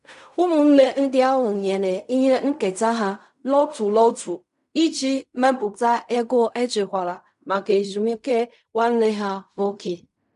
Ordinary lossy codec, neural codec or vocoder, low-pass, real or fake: none; codec, 16 kHz in and 24 kHz out, 0.4 kbps, LongCat-Audio-Codec, fine tuned four codebook decoder; 10.8 kHz; fake